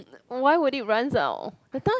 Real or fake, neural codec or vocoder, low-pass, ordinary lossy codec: real; none; none; none